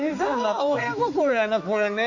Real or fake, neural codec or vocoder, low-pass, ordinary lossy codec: fake; codec, 16 kHz, 4 kbps, X-Codec, HuBERT features, trained on balanced general audio; 7.2 kHz; none